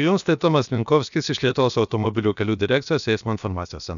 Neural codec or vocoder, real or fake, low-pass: codec, 16 kHz, about 1 kbps, DyCAST, with the encoder's durations; fake; 7.2 kHz